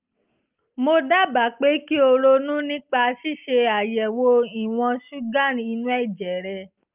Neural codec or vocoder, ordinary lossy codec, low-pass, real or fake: none; Opus, 32 kbps; 3.6 kHz; real